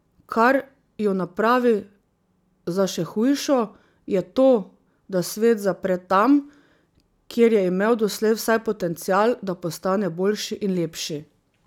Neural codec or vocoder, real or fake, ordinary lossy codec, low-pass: none; real; none; 19.8 kHz